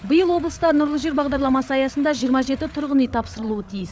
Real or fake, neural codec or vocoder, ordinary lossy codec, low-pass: fake; codec, 16 kHz, 8 kbps, FreqCodec, larger model; none; none